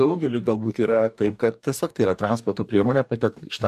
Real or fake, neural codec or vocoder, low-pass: fake; codec, 44.1 kHz, 2.6 kbps, DAC; 14.4 kHz